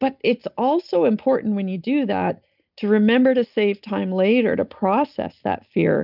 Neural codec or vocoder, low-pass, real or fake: none; 5.4 kHz; real